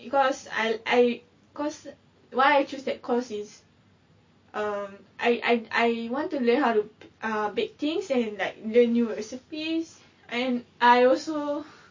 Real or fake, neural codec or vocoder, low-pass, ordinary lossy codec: real; none; 7.2 kHz; MP3, 32 kbps